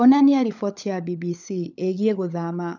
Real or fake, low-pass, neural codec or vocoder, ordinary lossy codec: fake; 7.2 kHz; codec, 16 kHz, 16 kbps, FunCodec, trained on LibriTTS, 50 frames a second; none